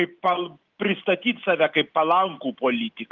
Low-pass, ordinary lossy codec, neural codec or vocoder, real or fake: 7.2 kHz; Opus, 32 kbps; none; real